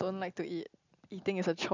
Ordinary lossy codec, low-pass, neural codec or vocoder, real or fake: none; 7.2 kHz; none; real